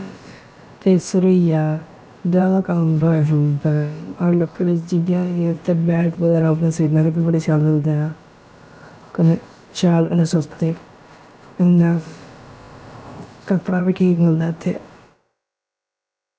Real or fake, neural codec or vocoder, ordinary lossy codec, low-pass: fake; codec, 16 kHz, about 1 kbps, DyCAST, with the encoder's durations; none; none